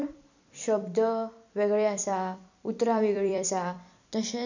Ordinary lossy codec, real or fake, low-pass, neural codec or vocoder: none; fake; 7.2 kHz; vocoder, 44.1 kHz, 128 mel bands every 256 samples, BigVGAN v2